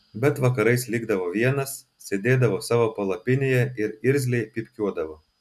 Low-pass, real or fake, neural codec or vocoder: 14.4 kHz; real; none